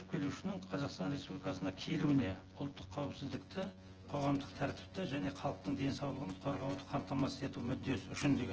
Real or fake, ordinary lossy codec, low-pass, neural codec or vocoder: fake; Opus, 16 kbps; 7.2 kHz; vocoder, 24 kHz, 100 mel bands, Vocos